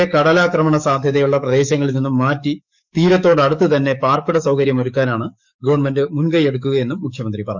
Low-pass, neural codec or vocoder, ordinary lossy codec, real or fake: 7.2 kHz; codec, 44.1 kHz, 7.8 kbps, DAC; none; fake